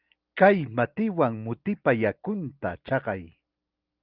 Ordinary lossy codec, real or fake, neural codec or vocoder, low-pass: Opus, 24 kbps; real; none; 5.4 kHz